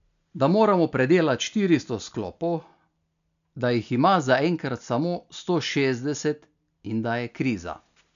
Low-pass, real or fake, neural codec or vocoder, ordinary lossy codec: 7.2 kHz; real; none; none